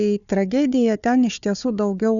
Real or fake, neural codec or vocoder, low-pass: fake; codec, 16 kHz, 4 kbps, FunCodec, trained on Chinese and English, 50 frames a second; 7.2 kHz